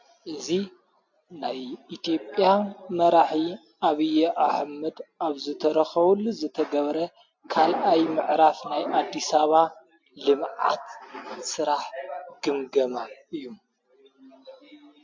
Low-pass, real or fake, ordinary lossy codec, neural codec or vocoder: 7.2 kHz; real; MP3, 48 kbps; none